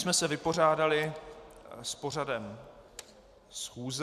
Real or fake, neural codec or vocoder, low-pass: real; none; 14.4 kHz